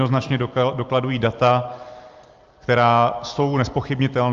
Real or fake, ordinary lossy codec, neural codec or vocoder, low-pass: real; Opus, 32 kbps; none; 7.2 kHz